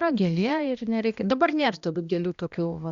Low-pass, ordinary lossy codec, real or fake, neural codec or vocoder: 7.2 kHz; Opus, 64 kbps; fake; codec, 16 kHz, 1 kbps, X-Codec, HuBERT features, trained on balanced general audio